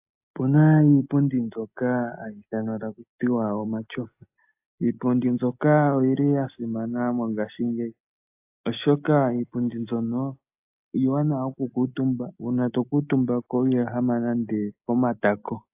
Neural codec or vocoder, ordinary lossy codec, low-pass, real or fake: none; AAC, 32 kbps; 3.6 kHz; real